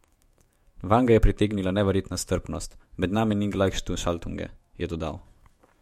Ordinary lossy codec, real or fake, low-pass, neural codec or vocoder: MP3, 64 kbps; fake; 19.8 kHz; autoencoder, 48 kHz, 128 numbers a frame, DAC-VAE, trained on Japanese speech